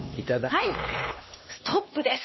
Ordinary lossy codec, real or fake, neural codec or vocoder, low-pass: MP3, 24 kbps; fake; codec, 16 kHz, 2 kbps, X-Codec, HuBERT features, trained on LibriSpeech; 7.2 kHz